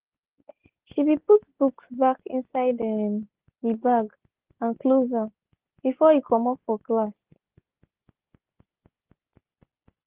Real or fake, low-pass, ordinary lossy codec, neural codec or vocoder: real; 3.6 kHz; Opus, 16 kbps; none